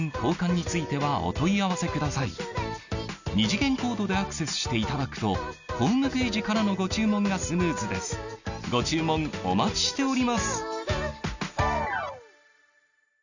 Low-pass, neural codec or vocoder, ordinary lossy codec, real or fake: 7.2 kHz; none; none; real